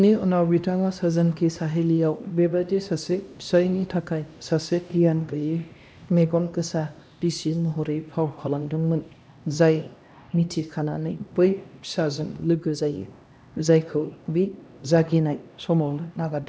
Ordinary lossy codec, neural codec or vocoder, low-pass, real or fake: none; codec, 16 kHz, 1 kbps, X-Codec, HuBERT features, trained on LibriSpeech; none; fake